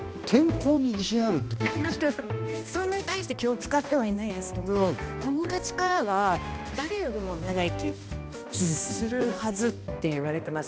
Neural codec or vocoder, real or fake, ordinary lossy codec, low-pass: codec, 16 kHz, 1 kbps, X-Codec, HuBERT features, trained on balanced general audio; fake; none; none